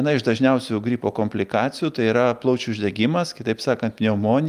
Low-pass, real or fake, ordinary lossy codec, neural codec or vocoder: 14.4 kHz; real; Opus, 32 kbps; none